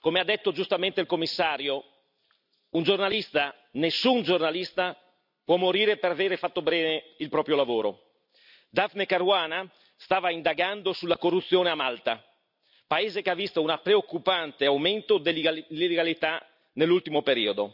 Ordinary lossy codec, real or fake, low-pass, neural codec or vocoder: none; real; 5.4 kHz; none